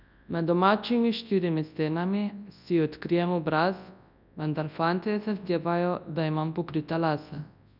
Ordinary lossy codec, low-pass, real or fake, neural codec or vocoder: none; 5.4 kHz; fake; codec, 24 kHz, 0.9 kbps, WavTokenizer, large speech release